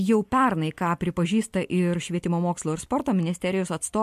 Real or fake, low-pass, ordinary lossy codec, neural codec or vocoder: real; 14.4 kHz; MP3, 64 kbps; none